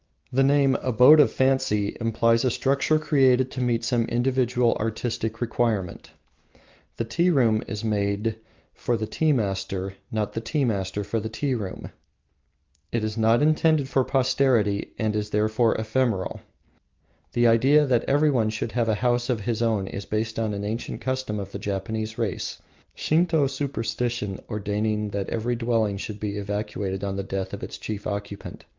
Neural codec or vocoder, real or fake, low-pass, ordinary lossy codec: none; real; 7.2 kHz; Opus, 24 kbps